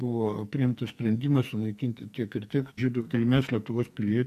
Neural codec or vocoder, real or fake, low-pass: codec, 44.1 kHz, 2.6 kbps, SNAC; fake; 14.4 kHz